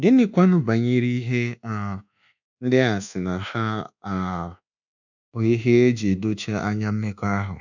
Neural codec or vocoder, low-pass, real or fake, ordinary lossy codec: codec, 24 kHz, 1.2 kbps, DualCodec; 7.2 kHz; fake; none